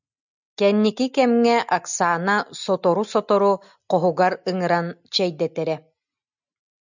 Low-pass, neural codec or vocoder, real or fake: 7.2 kHz; none; real